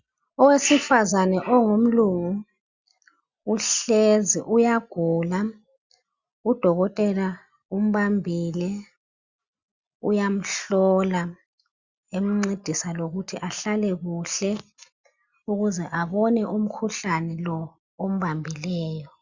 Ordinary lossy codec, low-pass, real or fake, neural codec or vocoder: Opus, 64 kbps; 7.2 kHz; real; none